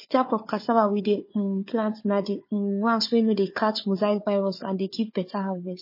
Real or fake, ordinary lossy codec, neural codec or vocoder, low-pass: fake; MP3, 24 kbps; codec, 16 kHz, 4 kbps, FunCodec, trained on Chinese and English, 50 frames a second; 5.4 kHz